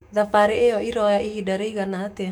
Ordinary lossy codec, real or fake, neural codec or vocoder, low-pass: none; fake; autoencoder, 48 kHz, 128 numbers a frame, DAC-VAE, trained on Japanese speech; 19.8 kHz